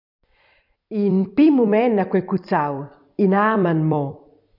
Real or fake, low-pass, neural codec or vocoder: fake; 5.4 kHz; vocoder, 44.1 kHz, 128 mel bands every 256 samples, BigVGAN v2